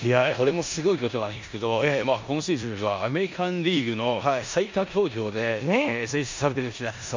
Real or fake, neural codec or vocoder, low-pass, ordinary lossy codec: fake; codec, 16 kHz in and 24 kHz out, 0.9 kbps, LongCat-Audio-Codec, four codebook decoder; 7.2 kHz; MP3, 64 kbps